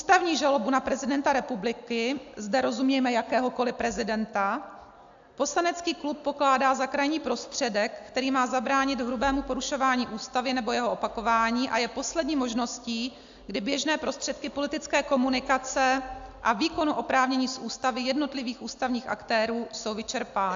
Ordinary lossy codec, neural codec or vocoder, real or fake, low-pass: AAC, 64 kbps; none; real; 7.2 kHz